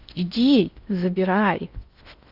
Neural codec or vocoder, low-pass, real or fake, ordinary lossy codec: codec, 16 kHz in and 24 kHz out, 0.6 kbps, FocalCodec, streaming, 4096 codes; 5.4 kHz; fake; Opus, 64 kbps